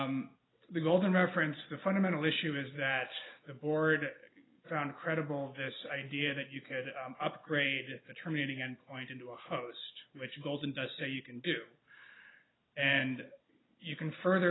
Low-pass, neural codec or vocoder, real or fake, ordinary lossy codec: 7.2 kHz; none; real; AAC, 16 kbps